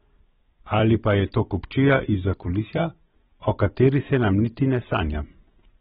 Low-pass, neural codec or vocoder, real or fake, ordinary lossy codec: 19.8 kHz; vocoder, 44.1 kHz, 128 mel bands every 256 samples, BigVGAN v2; fake; AAC, 16 kbps